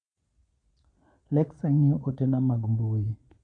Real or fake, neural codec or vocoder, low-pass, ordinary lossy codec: fake; vocoder, 22.05 kHz, 80 mel bands, Vocos; 9.9 kHz; none